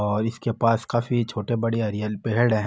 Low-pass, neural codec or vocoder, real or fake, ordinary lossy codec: none; none; real; none